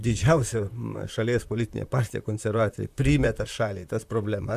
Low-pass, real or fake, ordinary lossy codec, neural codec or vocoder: 14.4 kHz; fake; AAC, 96 kbps; vocoder, 44.1 kHz, 128 mel bands, Pupu-Vocoder